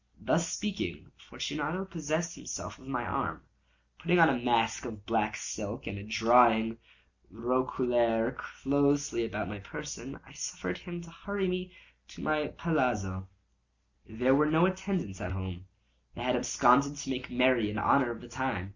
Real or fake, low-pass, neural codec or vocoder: real; 7.2 kHz; none